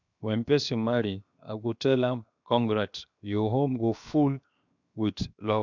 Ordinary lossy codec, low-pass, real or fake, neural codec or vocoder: none; 7.2 kHz; fake; codec, 16 kHz, 0.7 kbps, FocalCodec